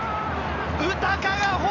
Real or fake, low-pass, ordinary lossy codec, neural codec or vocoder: real; 7.2 kHz; none; none